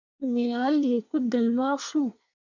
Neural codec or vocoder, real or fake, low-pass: codec, 24 kHz, 1 kbps, SNAC; fake; 7.2 kHz